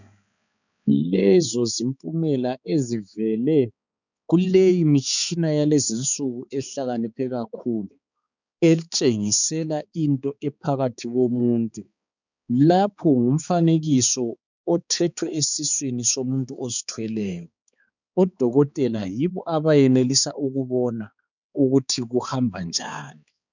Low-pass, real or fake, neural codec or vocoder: 7.2 kHz; fake; codec, 16 kHz, 4 kbps, X-Codec, HuBERT features, trained on balanced general audio